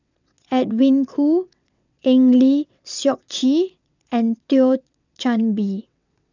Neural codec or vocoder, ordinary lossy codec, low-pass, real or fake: vocoder, 22.05 kHz, 80 mel bands, WaveNeXt; none; 7.2 kHz; fake